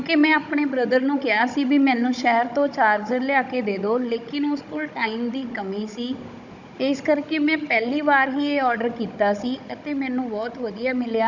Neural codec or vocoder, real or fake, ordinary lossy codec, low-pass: codec, 16 kHz, 16 kbps, FunCodec, trained on Chinese and English, 50 frames a second; fake; none; 7.2 kHz